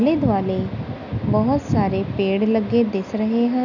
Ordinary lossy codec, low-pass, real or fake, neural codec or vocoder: none; 7.2 kHz; real; none